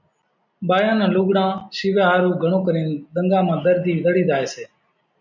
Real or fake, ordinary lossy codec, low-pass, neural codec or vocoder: real; MP3, 64 kbps; 7.2 kHz; none